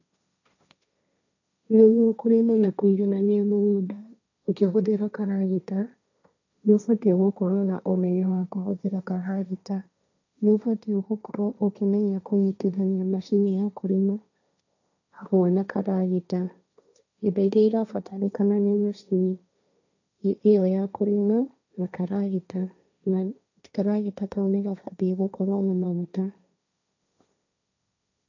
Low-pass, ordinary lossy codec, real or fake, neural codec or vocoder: 7.2 kHz; none; fake; codec, 16 kHz, 1.1 kbps, Voila-Tokenizer